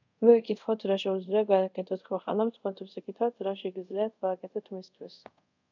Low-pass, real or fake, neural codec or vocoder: 7.2 kHz; fake; codec, 24 kHz, 0.5 kbps, DualCodec